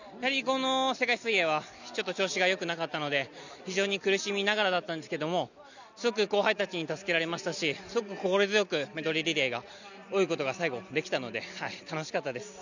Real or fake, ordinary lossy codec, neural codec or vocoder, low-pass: real; none; none; 7.2 kHz